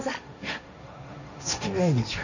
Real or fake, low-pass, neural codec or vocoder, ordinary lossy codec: fake; 7.2 kHz; codec, 16 kHz, 1.1 kbps, Voila-Tokenizer; none